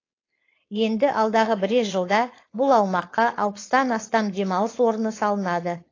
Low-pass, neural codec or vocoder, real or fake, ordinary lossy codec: 7.2 kHz; codec, 16 kHz, 4.8 kbps, FACodec; fake; AAC, 32 kbps